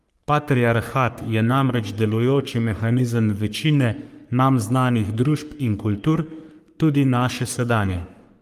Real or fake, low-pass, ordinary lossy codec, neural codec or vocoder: fake; 14.4 kHz; Opus, 32 kbps; codec, 44.1 kHz, 3.4 kbps, Pupu-Codec